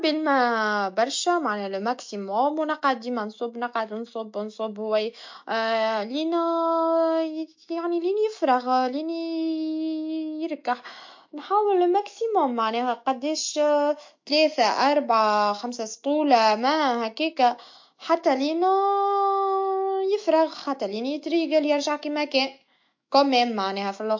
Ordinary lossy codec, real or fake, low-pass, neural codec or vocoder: MP3, 48 kbps; fake; 7.2 kHz; autoencoder, 48 kHz, 128 numbers a frame, DAC-VAE, trained on Japanese speech